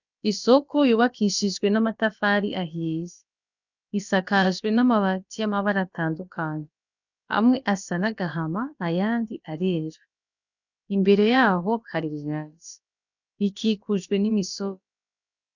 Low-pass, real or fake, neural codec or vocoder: 7.2 kHz; fake; codec, 16 kHz, about 1 kbps, DyCAST, with the encoder's durations